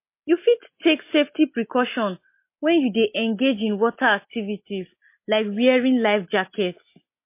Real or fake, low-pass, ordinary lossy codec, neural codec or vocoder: real; 3.6 kHz; MP3, 24 kbps; none